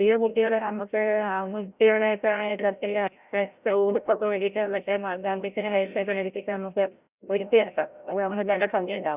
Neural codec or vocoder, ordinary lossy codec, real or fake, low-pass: codec, 16 kHz, 0.5 kbps, FreqCodec, larger model; Opus, 64 kbps; fake; 3.6 kHz